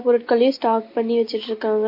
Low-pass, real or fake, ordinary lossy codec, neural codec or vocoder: 5.4 kHz; real; MP3, 32 kbps; none